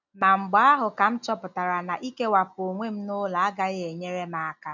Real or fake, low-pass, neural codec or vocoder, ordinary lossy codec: real; 7.2 kHz; none; none